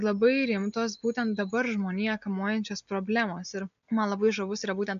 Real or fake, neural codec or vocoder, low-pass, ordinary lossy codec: real; none; 7.2 kHz; AAC, 96 kbps